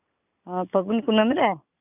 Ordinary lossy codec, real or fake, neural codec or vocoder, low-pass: none; real; none; 3.6 kHz